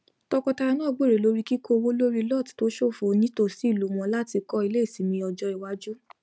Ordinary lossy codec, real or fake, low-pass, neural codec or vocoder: none; real; none; none